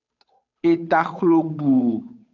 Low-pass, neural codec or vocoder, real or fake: 7.2 kHz; codec, 16 kHz, 8 kbps, FunCodec, trained on Chinese and English, 25 frames a second; fake